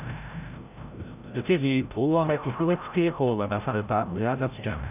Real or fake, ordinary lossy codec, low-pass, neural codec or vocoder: fake; none; 3.6 kHz; codec, 16 kHz, 0.5 kbps, FreqCodec, larger model